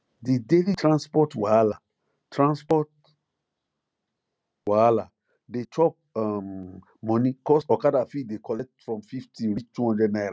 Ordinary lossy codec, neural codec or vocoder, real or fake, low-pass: none; none; real; none